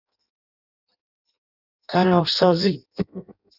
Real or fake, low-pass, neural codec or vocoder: fake; 5.4 kHz; codec, 16 kHz in and 24 kHz out, 0.6 kbps, FireRedTTS-2 codec